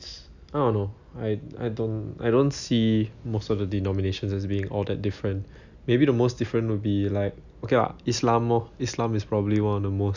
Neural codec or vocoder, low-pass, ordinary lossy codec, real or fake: none; 7.2 kHz; none; real